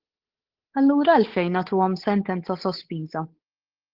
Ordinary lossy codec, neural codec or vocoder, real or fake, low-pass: Opus, 16 kbps; codec, 16 kHz, 8 kbps, FunCodec, trained on Chinese and English, 25 frames a second; fake; 5.4 kHz